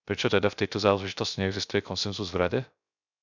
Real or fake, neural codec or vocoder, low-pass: fake; codec, 16 kHz, 0.3 kbps, FocalCodec; 7.2 kHz